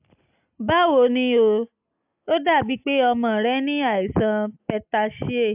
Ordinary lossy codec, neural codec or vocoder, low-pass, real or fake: AAC, 32 kbps; none; 3.6 kHz; real